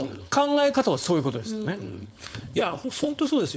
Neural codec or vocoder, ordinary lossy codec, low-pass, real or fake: codec, 16 kHz, 4.8 kbps, FACodec; none; none; fake